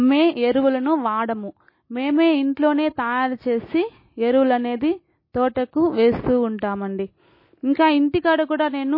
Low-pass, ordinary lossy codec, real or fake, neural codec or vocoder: 5.4 kHz; MP3, 24 kbps; real; none